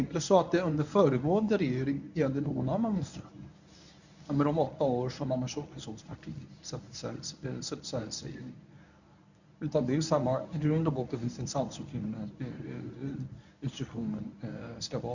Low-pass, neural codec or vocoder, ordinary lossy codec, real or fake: 7.2 kHz; codec, 24 kHz, 0.9 kbps, WavTokenizer, medium speech release version 1; none; fake